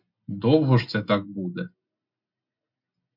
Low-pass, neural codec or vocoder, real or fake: 5.4 kHz; none; real